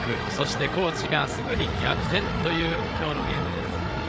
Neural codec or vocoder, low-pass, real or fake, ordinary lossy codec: codec, 16 kHz, 8 kbps, FreqCodec, larger model; none; fake; none